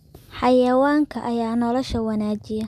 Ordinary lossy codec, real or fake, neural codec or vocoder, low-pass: MP3, 96 kbps; real; none; 14.4 kHz